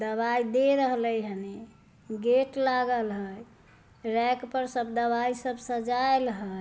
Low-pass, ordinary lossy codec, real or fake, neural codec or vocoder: none; none; real; none